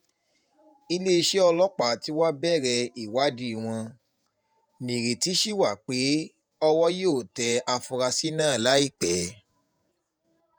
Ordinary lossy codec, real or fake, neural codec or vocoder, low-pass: none; fake; vocoder, 48 kHz, 128 mel bands, Vocos; none